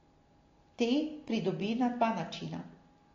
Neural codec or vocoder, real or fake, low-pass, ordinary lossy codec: none; real; 7.2 kHz; AAC, 32 kbps